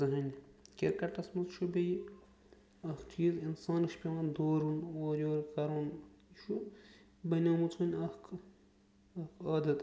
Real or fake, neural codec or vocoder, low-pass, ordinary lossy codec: real; none; none; none